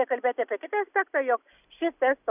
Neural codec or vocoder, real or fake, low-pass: none; real; 3.6 kHz